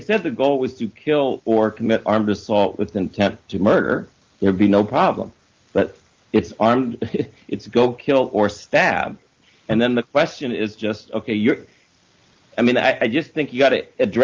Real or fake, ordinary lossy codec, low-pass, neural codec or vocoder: real; Opus, 24 kbps; 7.2 kHz; none